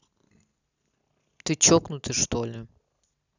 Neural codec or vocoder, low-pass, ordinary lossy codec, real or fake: none; 7.2 kHz; none; real